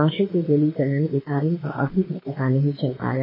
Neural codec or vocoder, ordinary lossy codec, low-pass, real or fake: autoencoder, 48 kHz, 32 numbers a frame, DAC-VAE, trained on Japanese speech; MP3, 24 kbps; 5.4 kHz; fake